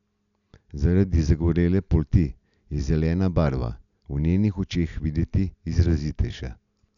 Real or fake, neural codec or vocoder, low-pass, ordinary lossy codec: real; none; 7.2 kHz; none